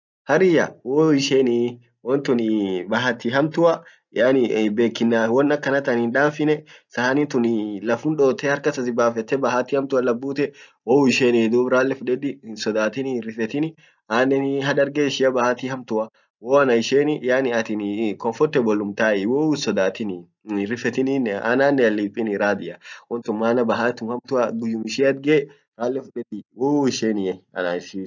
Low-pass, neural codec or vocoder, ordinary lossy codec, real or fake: 7.2 kHz; none; none; real